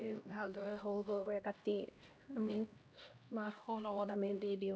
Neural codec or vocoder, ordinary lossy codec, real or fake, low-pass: codec, 16 kHz, 1 kbps, X-Codec, HuBERT features, trained on LibriSpeech; none; fake; none